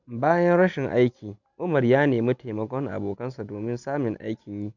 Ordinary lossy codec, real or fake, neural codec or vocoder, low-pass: none; real; none; 7.2 kHz